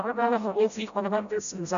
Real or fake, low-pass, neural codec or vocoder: fake; 7.2 kHz; codec, 16 kHz, 0.5 kbps, FreqCodec, smaller model